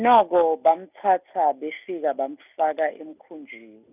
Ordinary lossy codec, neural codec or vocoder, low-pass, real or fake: none; none; 3.6 kHz; real